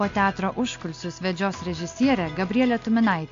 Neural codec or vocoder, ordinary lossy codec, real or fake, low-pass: none; AAC, 48 kbps; real; 7.2 kHz